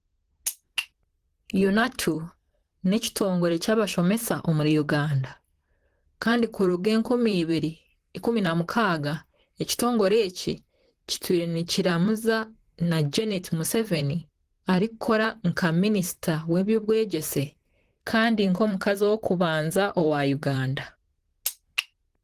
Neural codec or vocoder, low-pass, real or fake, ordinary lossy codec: vocoder, 44.1 kHz, 128 mel bands, Pupu-Vocoder; 14.4 kHz; fake; Opus, 16 kbps